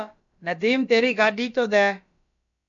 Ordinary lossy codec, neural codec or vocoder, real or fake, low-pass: MP3, 64 kbps; codec, 16 kHz, about 1 kbps, DyCAST, with the encoder's durations; fake; 7.2 kHz